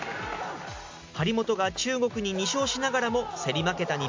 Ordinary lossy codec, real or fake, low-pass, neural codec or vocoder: MP3, 48 kbps; real; 7.2 kHz; none